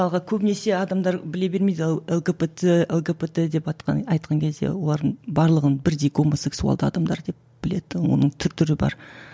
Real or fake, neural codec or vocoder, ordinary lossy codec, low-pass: real; none; none; none